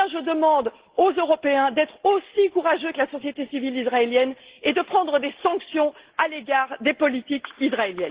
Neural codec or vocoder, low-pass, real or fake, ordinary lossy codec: none; 3.6 kHz; real; Opus, 16 kbps